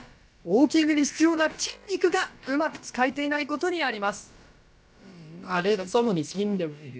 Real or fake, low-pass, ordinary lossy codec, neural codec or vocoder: fake; none; none; codec, 16 kHz, about 1 kbps, DyCAST, with the encoder's durations